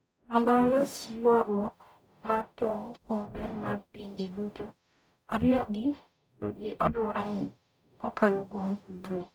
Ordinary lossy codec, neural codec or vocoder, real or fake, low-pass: none; codec, 44.1 kHz, 0.9 kbps, DAC; fake; none